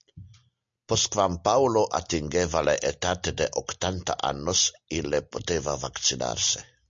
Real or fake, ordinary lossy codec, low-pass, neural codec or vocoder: real; MP3, 48 kbps; 7.2 kHz; none